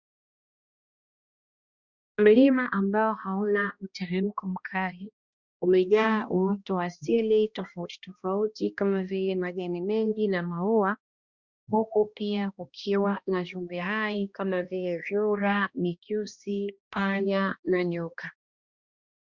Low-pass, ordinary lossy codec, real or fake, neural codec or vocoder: 7.2 kHz; Opus, 64 kbps; fake; codec, 16 kHz, 1 kbps, X-Codec, HuBERT features, trained on balanced general audio